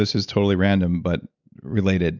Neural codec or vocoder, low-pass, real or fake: none; 7.2 kHz; real